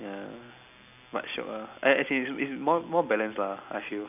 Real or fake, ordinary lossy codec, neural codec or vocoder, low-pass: real; none; none; 3.6 kHz